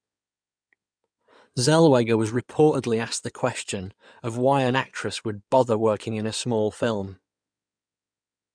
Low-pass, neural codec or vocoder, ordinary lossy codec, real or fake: 9.9 kHz; codec, 16 kHz in and 24 kHz out, 2.2 kbps, FireRedTTS-2 codec; none; fake